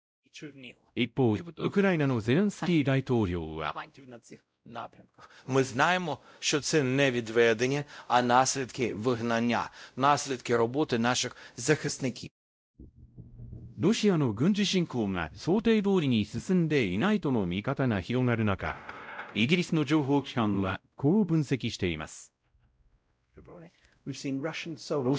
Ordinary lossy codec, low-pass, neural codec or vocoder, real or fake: none; none; codec, 16 kHz, 0.5 kbps, X-Codec, WavLM features, trained on Multilingual LibriSpeech; fake